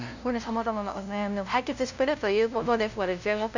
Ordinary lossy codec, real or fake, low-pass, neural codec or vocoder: none; fake; 7.2 kHz; codec, 16 kHz, 0.5 kbps, FunCodec, trained on LibriTTS, 25 frames a second